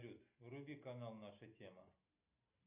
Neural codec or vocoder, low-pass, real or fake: none; 3.6 kHz; real